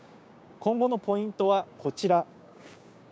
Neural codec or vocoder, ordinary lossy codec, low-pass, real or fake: codec, 16 kHz, 6 kbps, DAC; none; none; fake